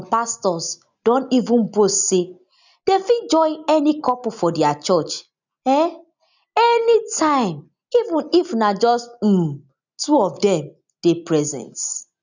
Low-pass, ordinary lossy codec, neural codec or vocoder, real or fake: 7.2 kHz; none; none; real